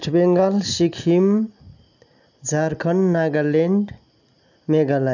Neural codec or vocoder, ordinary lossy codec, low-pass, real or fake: none; none; 7.2 kHz; real